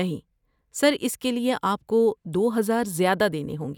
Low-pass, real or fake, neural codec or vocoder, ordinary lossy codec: 19.8 kHz; real; none; none